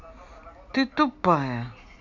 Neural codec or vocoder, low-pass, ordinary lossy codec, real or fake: none; 7.2 kHz; none; real